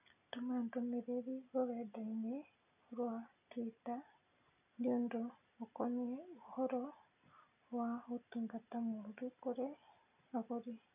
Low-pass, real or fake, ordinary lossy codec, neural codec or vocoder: 3.6 kHz; real; none; none